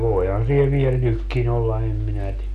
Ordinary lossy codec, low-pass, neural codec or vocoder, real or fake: none; 14.4 kHz; none; real